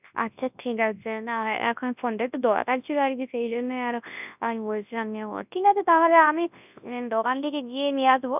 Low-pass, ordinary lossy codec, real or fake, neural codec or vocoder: 3.6 kHz; none; fake; codec, 24 kHz, 0.9 kbps, WavTokenizer, large speech release